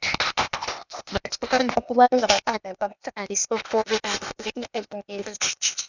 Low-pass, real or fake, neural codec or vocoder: 7.2 kHz; fake; codec, 16 kHz, 0.8 kbps, ZipCodec